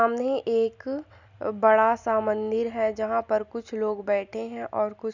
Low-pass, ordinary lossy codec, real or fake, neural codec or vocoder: 7.2 kHz; none; real; none